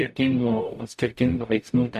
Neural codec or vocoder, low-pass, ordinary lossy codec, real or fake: codec, 44.1 kHz, 0.9 kbps, DAC; 14.4 kHz; MP3, 64 kbps; fake